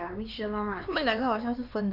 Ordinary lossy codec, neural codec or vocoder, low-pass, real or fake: none; codec, 16 kHz, 4 kbps, X-Codec, HuBERT features, trained on LibriSpeech; 5.4 kHz; fake